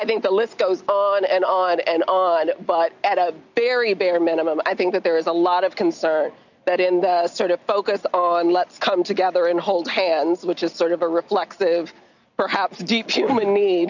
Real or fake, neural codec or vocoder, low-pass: real; none; 7.2 kHz